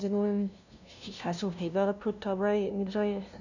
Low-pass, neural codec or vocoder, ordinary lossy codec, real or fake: 7.2 kHz; codec, 16 kHz, 0.5 kbps, FunCodec, trained on LibriTTS, 25 frames a second; none; fake